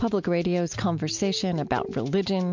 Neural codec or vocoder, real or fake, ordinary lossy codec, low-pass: none; real; MP3, 64 kbps; 7.2 kHz